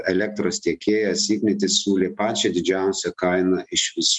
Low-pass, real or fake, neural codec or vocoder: 10.8 kHz; real; none